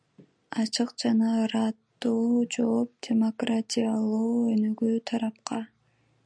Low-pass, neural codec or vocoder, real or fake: 9.9 kHz; none; real